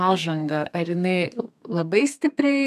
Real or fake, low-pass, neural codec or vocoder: fake; 14.4 kHz; codec, 32 kHz, 1.9 kbps, SNAC